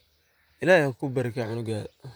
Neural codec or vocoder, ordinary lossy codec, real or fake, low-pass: vocoder, 44.1 kHz, 128 mel bands, Pupu-Vocoder; none; fake; none